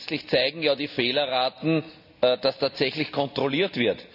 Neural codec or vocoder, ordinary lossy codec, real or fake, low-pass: none; none; real; 5.4 kHz